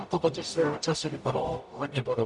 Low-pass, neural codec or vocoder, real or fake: 10.8 kHz; codec, 44.1 kHz, 0.9 kbps, DAC; fake